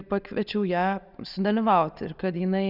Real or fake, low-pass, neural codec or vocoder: real; 5.4 kHz; none